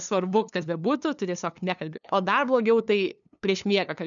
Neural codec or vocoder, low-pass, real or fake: codec, 16 kHz, 2 kbps, FunCodec, trained on LibriTTS, 25 frames a second; 7.2 kHz; fake